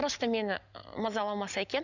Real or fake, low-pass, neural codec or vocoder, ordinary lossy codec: real; 7.2 kHz; none; none